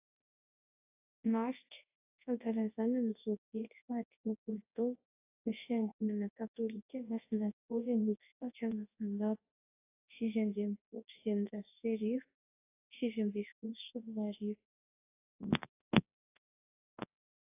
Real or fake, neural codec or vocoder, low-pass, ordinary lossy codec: fake; codec, 24 kHz, 0.9 kbps, WavTokenizer, large speech release; 3.6 kHz; AAC, 32 kbps